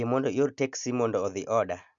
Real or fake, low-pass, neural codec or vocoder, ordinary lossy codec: real; 7.2 kHz; none; MP3, 64 kbps